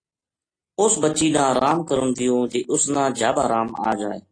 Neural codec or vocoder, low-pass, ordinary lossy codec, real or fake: none; 9.9 kHz; AAC, 32 kbps; real